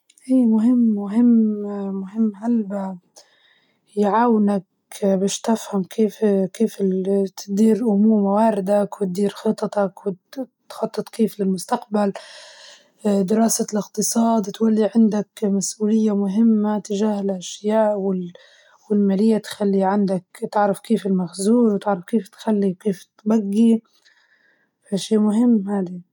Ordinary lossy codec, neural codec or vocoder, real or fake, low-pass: none; none; real; 19.8 kHz